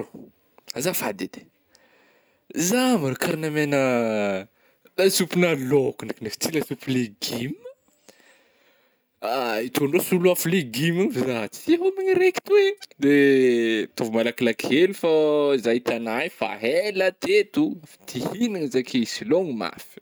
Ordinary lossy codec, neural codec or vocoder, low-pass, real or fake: none; none; none; real